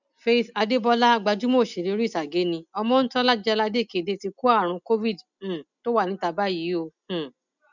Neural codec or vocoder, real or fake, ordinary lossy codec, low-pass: none; real; none; 7.2 kHz